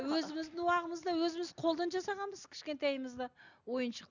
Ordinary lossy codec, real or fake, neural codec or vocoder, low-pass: none; real; none; 7.2 kHz